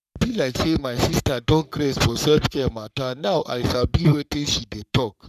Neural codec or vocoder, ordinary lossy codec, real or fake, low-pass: codec, 44.1 kHz, 3.4 kbps, Pupu-Codec; none; fake; 14.4 kHz